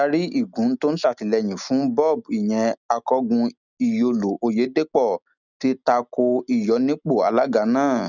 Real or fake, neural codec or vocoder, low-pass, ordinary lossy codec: real; none; 7.2 kHz; none